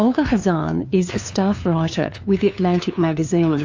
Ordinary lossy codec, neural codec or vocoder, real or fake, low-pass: AAC, 48 kbps; codec, 16 kHz, 2 kbps, FunCodec, trained on LibriTTS, 25 frames a second; fake; 7.2 kHz